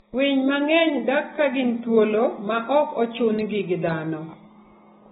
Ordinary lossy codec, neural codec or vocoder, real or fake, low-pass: AAC, 16 kbps; none; real; 10.8 kHz